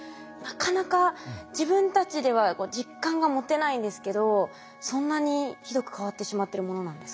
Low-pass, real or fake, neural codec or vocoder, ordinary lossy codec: none; real; none; none